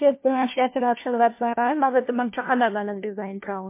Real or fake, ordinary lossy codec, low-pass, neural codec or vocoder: fake; MP3, 24 kbps; 3.6 kHz; codec, 16 kHz, 1 kbps, FunCodec, trained on LibriTTS, 50 frames a second